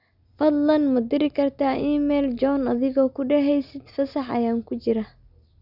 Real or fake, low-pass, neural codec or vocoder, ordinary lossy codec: real; 5.4 kHz; none; none